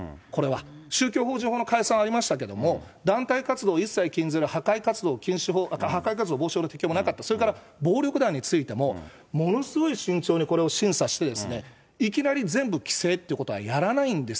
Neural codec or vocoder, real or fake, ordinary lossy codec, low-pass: none; real; none; none